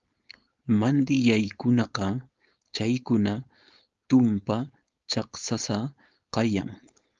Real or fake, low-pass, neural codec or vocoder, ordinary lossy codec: fake; 7.2 kHz; codec, 16 kHz, 4.8 kbps, FACodec; Opus, 32 kbps